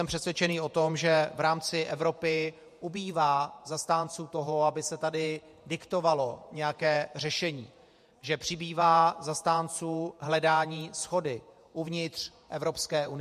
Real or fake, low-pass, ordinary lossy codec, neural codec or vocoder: fake; 14.4 kHz; MP3, 64 kbps; vocoder, 48 kHz, 128 mel bands, Vocos